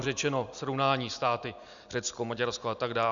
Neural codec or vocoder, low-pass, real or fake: none; 7.2 kHz; real